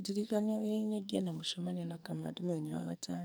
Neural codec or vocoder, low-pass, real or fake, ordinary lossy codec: codec, 44.1 kHz, 2.6 kbps, SNAC; none; fake; none